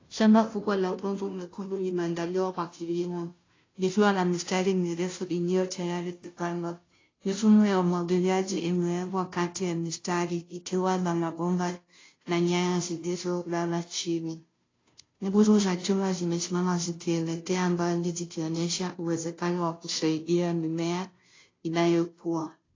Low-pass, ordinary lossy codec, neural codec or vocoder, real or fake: 7.2 kHz; AAC, 32 kbps; codec, 16 kHz, 0.5 kbps, FunCodec, trained on Chinese and English, 25 frames a second; fake